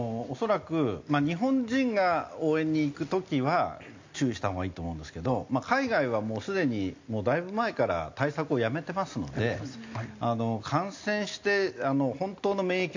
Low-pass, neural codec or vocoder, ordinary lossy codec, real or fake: 7.2 kHz; none; AAC, 48 kbps; real